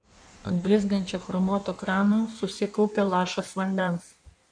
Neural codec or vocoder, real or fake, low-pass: codec, 16 kHz in and 24 kHz out, 1.1 kbps, FireRedTTS-2 codec; fake; 9.9 kHz